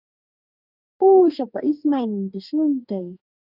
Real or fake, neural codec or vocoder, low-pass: fake; codec, 44.1 kHz, 2.6 kbps, DAC; 5.4 kHz